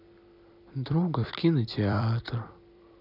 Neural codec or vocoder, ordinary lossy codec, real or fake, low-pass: vocoder, 44.1 kHz, 128 mel bands every 256 samples, BigVGAN v2; none; fake; 5.4 kHz